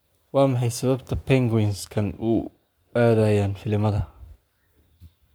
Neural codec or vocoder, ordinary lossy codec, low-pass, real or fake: codec, 44.1 kHz, 7.8 kbps, Pupu-Codec; none; none; fake